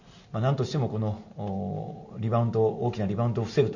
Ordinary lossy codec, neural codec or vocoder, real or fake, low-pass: none; none; real; 7.2 kHz